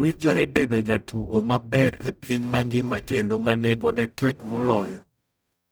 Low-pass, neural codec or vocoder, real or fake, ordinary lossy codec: none; codec, 44.1 kHz, 0.9 kbps, DAC; fake; none